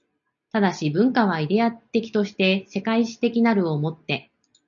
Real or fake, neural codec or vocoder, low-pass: real; none; 7.2 kHz